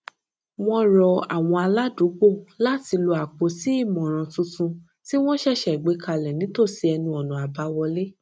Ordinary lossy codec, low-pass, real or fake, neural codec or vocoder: none; none; real; none